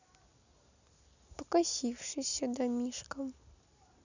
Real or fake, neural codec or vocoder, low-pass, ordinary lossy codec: real; none; 7.2 kHz; none